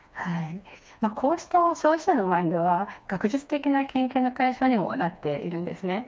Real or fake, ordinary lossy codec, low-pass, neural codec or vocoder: fake; none; none; codec, 16 kHz, 2 kbps, FreqCodec, smaller model